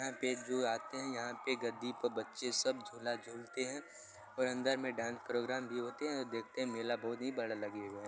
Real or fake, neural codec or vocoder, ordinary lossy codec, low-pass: real; none; none; none